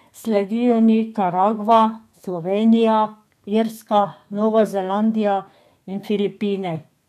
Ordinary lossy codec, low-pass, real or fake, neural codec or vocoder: none; 14.4 kHz; fake; codec, 32 kHz, 1.9 kbps, SNAC